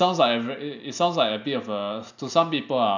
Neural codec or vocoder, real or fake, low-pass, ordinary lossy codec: none; real; 7.2 kHz; none